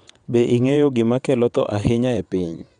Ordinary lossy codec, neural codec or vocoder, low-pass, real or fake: none; vocoder, 22.05 kHz, 80 mel bands, WaveNeXt; 9.9 kHz; fake